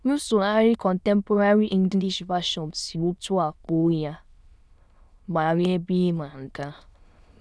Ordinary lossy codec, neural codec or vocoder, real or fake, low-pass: none; autoencoder, 22.05 kHz, a latent of 192 numbers a frame, VITS, trained on many speakers; fake; none